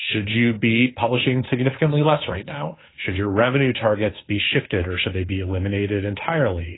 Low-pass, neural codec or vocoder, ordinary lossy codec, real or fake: 7.2 kHz; codec, 16 kHz, 2 kbps, FunCodec, trained on Chinese and English, 25 frames a second; AAC, 16 kbps; fake